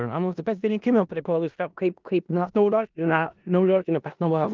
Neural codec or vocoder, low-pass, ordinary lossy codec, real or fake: codec, 16 kHz in and 24 kHz out, 0.4 kbps, LongCat-Audio-Codec, four codebook decoder; 7.2 kHz; Opus, 32 kbps; fake